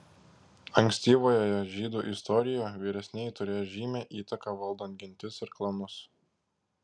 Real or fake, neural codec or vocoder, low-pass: real; none; 9.9 kHz